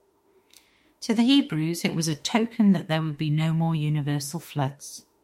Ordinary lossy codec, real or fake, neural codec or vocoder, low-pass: MP3, 64 kbps; fake; autoencoder, 48 kHz, 32 numbers a frame, DAC-VAE, trained on Japanese speech; 19.8 kHz